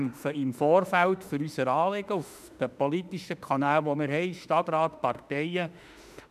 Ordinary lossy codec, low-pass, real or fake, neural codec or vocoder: none; 14.4 kHz; fake; autoencoder, 48 kHz, 32 numbers a frame, DAC-VAE, trained on Japanese speech